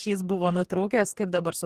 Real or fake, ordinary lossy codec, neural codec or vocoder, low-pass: fake; Opus, 16 kbps; codec, 44.1 kHz, 2.6 kbps, DAC; 14.4 kHz